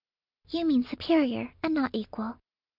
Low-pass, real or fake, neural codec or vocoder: 5.4 kHz; real; none